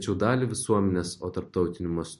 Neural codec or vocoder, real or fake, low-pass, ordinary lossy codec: none; real; 14.4 kHz; MP3, 48 kbps